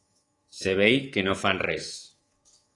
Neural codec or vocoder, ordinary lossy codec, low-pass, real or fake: codec, 24 kHz, 3.1 kbps, DualCodec; AAC, 32 kbps; 10.8 kHz; fake